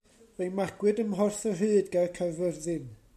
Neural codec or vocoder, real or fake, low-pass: none; real; 14.4 kHz